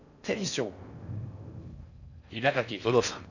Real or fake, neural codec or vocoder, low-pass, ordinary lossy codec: fake; codec, 16 kHz in and 24 kHz out, 0.6 kbps, FocalCodec, streaming, 4096 codes; 7.2 kHz; none